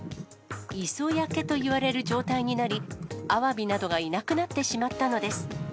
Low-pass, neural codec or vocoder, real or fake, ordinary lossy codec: none; none; real; none